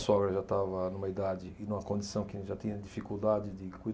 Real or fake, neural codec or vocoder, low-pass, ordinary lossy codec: real; none; none; none